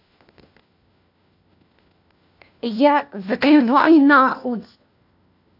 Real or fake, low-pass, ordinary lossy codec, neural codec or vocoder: fake; 5.4 kHz; AAC, 48 kbps; codec, 16 kHz, 1 kbps, FunCodec, trained on LibriTTS, 50 frames a second